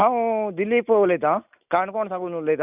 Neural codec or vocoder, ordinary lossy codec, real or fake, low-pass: none; none; real; 3.6 kHz